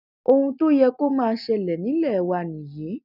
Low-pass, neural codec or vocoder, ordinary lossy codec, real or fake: 5.4 kHz; none; none; real